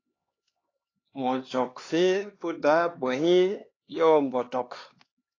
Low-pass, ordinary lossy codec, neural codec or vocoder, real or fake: 7.2 kHz; AAC, 32 kbps; codec, 16 kHz, 2 kbps, X-Codec, HuBERT features, trained on LibriSpeech; fake